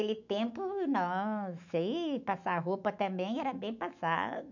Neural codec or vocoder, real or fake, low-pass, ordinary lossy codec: autoencoder, 48 kHz, 128 numbers a frame, DAC-VAE, trained on Japanese speech; fake; 7.2 kHz; none